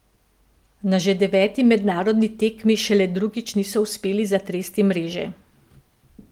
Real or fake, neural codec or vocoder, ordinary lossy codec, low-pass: real; none; Opus, 24 kbps; 19.8 kHz